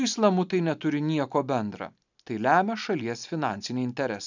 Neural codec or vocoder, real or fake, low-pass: none; real; 7.2 kHz